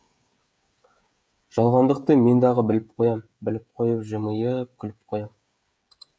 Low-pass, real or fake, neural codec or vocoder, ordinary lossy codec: none; fake; codec, 16 kHz, 16 kbps, FreqCodec, smaller model; none